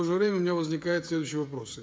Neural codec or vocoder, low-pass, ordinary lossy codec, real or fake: codec, 16 kHz, 16 kbps, FreqCodec, smaller model; none; none; fake